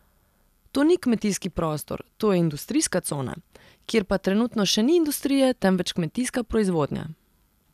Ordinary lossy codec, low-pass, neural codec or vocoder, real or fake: none; 14.4 kHz; none; real